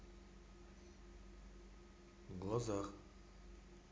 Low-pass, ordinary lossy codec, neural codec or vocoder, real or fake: none; none; none; real